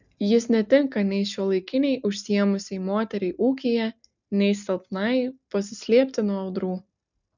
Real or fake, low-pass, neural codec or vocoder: real; 7.2 kHz; none